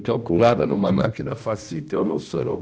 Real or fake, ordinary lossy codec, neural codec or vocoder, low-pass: fake; none; codec, 16 kHz, 1 kbps, X-Codec, HuBERT features, trained on balanced general audio; none